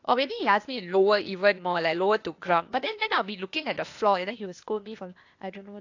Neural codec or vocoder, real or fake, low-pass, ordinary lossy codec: codec, 16 kHz, 0.8 kbps, ZipCodec; fake; 7.2 kHz; AAC, 48 kbps